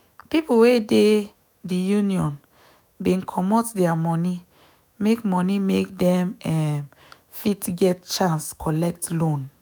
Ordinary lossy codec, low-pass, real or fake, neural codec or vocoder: none; none; fake; autoencoder, 48 kHz, 128 numbers a frame, DAC-VAE, trained on Japanese speech